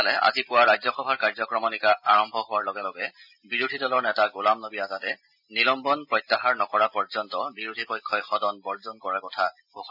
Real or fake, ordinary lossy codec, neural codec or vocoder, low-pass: real; none; none; 5.4 kHz